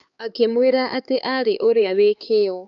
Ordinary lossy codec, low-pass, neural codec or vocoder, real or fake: none; 7.2 kHz; codec, 16 kHz, 4 kbps, X-Codec, HuBERT features, trained on LibriSpeech; fake